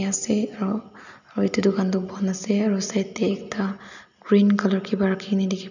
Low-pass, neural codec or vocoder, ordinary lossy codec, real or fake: 7.2 kHz; none; none; real